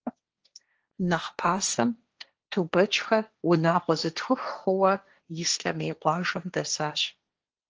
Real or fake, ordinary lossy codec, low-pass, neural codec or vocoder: fake; Opus, 24 kbps; 7.2 kHz; codec, 16 kHz, 1.1 kbps, Voila-Tokenizer